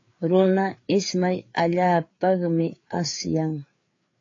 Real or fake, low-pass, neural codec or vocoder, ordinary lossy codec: fake; 7.2 kHz; codec, 16 kHz, 4 kbps, FreqCodec, larger model; AAC, 32 kbps